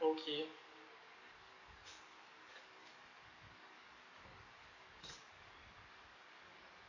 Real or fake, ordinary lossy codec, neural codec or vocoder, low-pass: fake; none; codec, 44.1 kHz, 7.8 kbps, DAC; 7.2 kHz